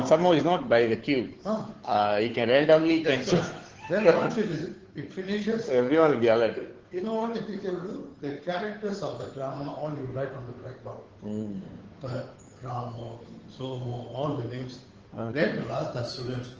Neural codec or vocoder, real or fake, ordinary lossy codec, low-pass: codec, 16 kHz, 2 kbps, FunCodec, trained on Chinese and English, 25 frames a second; fake; Opus, 16 kbps; 7.2 kHz